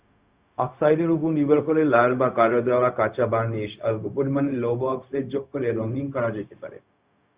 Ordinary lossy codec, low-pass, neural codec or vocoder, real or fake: Opus, 64 kbps; 3.6 kHz; codec, 16 kHz, 0.4 kbps, LongCat-Audio-Codec; fake